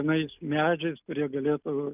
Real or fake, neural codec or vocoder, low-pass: real; none; 3.6 kHz